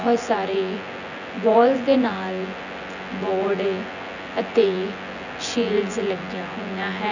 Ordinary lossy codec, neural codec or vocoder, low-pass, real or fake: none; vocoder, 24 kHz, 100 mel bands, Vocos; 7.2 kHz; fake